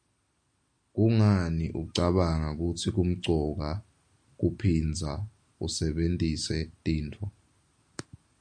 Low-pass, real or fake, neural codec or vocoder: 9.9 kHz; real; none